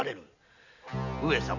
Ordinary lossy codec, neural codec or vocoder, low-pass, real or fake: none; none; 7.2 kHz; real